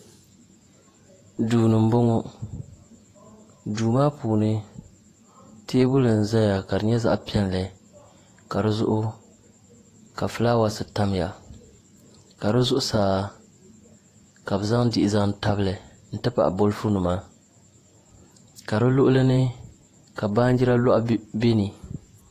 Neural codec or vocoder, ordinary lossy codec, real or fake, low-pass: vocoder, 48 kHz, 128 mel bands, Vocos; AAC, 48 kbps; fake; 14.4 kHz